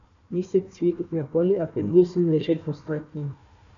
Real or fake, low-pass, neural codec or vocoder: fake; 7.2 kHz; codec, 16 kHz, 1 kbps, FunCodec, trained on Chinese and English, 50 frames a second